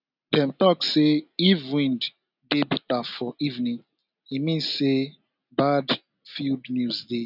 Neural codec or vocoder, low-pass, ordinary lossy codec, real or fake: none; 5.4 kHz; none; real